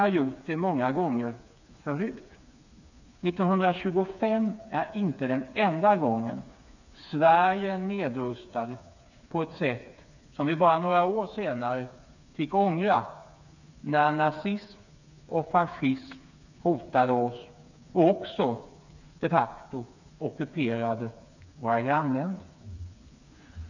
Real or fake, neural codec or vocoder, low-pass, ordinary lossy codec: fake; codec, 16 kHz, 4 kbps, FreqCodec, smaller model; 7.2 kHz; none